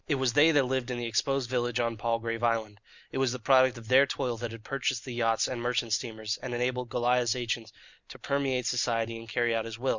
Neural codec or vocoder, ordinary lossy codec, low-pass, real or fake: none; Opus, 64 kbps; 7.2 kHz; real